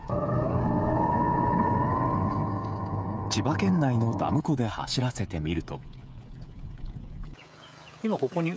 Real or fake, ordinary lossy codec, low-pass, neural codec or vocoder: fake; none; none; codec, 16 kHz, 16 kbps, FreqCodec, smaller model